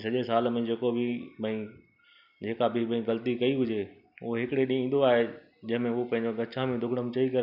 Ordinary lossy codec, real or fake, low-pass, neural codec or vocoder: none; real; 5.4 kHz; none